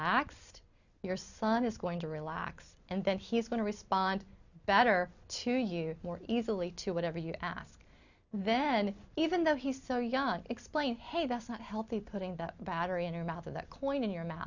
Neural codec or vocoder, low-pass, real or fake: none; 7.2 kHz; real